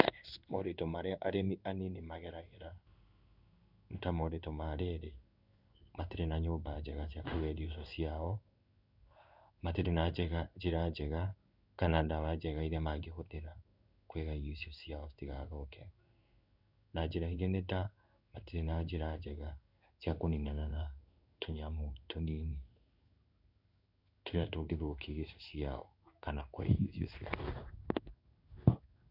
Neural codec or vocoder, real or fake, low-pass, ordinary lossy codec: codec, 16 kHz in and 24 kHz out, 1 kbps, XY-Tokenizer; fake; 5.4 kHz; none